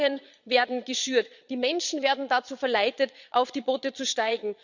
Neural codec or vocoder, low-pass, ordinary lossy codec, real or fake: vocoder, 22.05 kHz, 80 mel bands, Vocos; 7.2 kHz; Opus, 64 kbps; fake